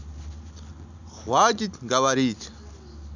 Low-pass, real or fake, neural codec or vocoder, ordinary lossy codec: 7.2 kHz; real; none; none